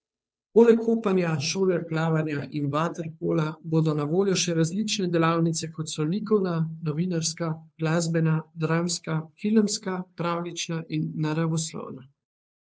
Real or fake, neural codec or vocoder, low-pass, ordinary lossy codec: fake; codec, 16 kHz, 2 kbps, FunCodec, trained on Chinese and English, 25 frames a second; none; none